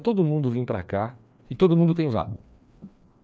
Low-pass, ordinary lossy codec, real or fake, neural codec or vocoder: none; none; fake; codec, 16 kHz, 2 kbps, FreqCodec, larger model